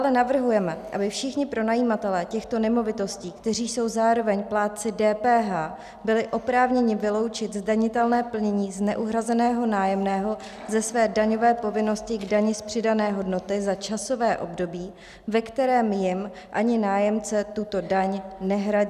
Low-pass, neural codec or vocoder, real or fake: 14.4 kHz; none; real